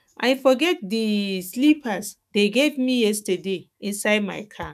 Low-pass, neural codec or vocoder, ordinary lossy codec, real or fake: 14.4 kHz; autoencoder, 48 kHz, 128 numbers a frame, DAC-VAE, trained on Japanese speech; none; fake